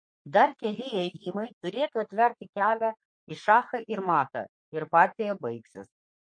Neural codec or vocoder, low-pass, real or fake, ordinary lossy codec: codec, 44.1 kHz, 3.4 kbps, Pupu-Codec; 9.9 kHz; fake; MP3, 48 kbps